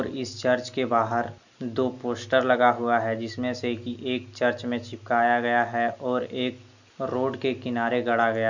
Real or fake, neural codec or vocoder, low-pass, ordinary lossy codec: real; none; 7.2 kHz; none